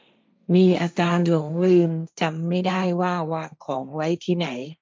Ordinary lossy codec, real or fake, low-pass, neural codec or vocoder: none; fake; none; codec, 16 kHz, 1.1 kbps, Voila-Tokenizer